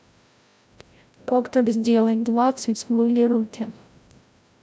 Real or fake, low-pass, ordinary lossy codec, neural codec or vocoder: fake; none; none; codec, 16 kHz, 0.5 kbps, FreqCodec, larger model